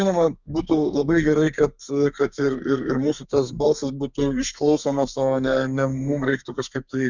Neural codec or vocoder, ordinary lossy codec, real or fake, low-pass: codec, 32 kHz, 1.9 kbps, SNAC; Opus, 64 kbps; fake; 7.2 kHz